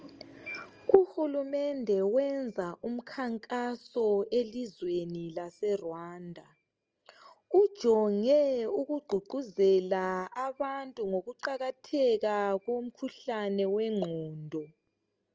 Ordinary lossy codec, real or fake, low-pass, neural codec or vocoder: Opus, 24 kbps; real; 7.2 kHz; none